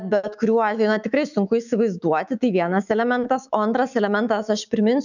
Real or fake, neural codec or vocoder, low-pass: fake; autoencoder, 48 kHz, 128 numbers a frame, DAC-VAE, trained on Japanese speech; 7.2 kHz